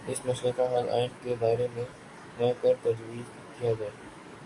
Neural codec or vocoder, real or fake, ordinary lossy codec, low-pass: codec, 44.1 kHz, 7.8 kbps, DAC; fake; Opus, 64 kbps; 10.8 kHz